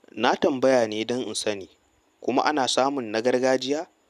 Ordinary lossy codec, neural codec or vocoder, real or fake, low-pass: none; none; real; 14.4 kHz